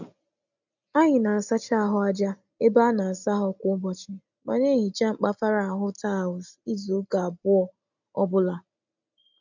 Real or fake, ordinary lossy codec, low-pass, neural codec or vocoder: real; none; 7.2 kHz; none